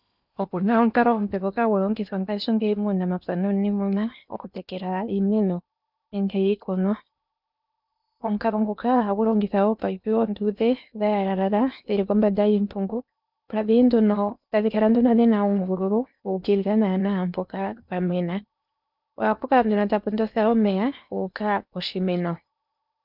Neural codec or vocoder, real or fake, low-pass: codec, 16 kHz in and 24 kHz out, 0.8 kbps, FocalCodec, streaming, 65536 codes; fake; 5.4 kHz